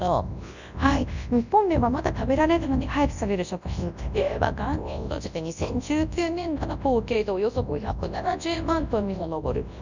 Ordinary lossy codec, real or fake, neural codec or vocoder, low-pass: none; fake; codec, 24 kHz, 0.9 kbps, WavTokenizer, large speech release; 7.2 kHz